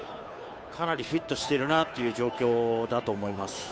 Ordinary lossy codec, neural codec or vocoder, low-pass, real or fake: none; codec, 16 kHz, 2 kbps, FunCodec, trained on Chinese and English, 25 frames a second; none; fake